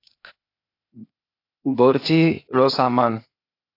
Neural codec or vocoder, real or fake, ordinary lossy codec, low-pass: codec, 16 kHz, 0.8 kbps, ZipCodec; fake; AAC, 32 kbps; 5.4 kHz